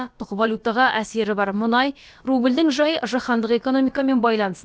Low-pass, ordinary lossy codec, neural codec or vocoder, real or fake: none; none; codec, 16 kHz, about 1 kbps, DyCAST, with the encoder's durations; fake